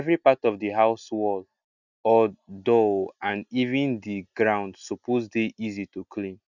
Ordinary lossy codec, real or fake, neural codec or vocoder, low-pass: none; real; none; 7.2 kHz